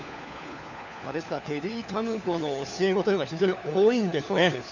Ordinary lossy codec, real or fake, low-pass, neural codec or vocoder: none; fake; 7.2 kHz; codec, 16 kHz, 4 kbps, FunCodec, trained on LibriTTS, 50 frames a second